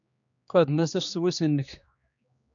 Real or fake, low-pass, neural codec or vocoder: fake; 7.2 kHz; codec, 16 kHz, 2 kbps, X-Codec, HuBERT features, trained on general audio